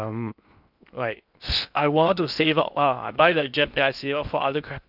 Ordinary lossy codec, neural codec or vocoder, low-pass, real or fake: none; codec, 16 kHz in and 24 kHz out, 0.6 kbps, FocalCodec, streaming, 2048 codes; 5.4 kHz; fake